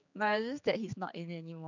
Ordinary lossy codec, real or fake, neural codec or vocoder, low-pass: none; fake; codec, 16 kHz, 4 kbps, X-Codec, HuBERT features, trained on general audio; 7.2 kHz